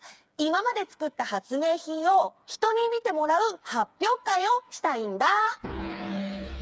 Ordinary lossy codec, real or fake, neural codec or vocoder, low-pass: none; fake; codec, 16 kHz, 4 kbps, FreqCodec, smaller model; none